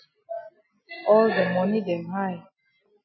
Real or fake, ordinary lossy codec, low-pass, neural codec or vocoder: real; MP3, 24 kbps; 7.2 kHz; none